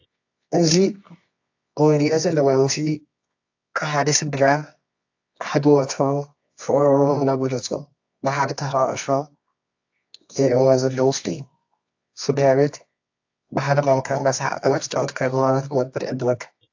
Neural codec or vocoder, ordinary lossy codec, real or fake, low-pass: codec, 24 kHz, 0.9 kbps, WavTokenizer, medium music audio release; AAC, 48 kbps; fake; 7.2 kHz